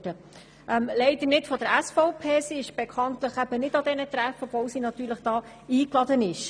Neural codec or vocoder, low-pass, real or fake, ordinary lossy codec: none; 9.9 kHz; real; none